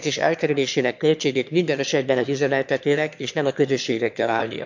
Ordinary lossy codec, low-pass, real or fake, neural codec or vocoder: MP3, 64 kbps; 7.2 kHz; fake; autoencoder, 22.05 kHz, a latent of 192 numbers a frame, VITS, trained on one speaker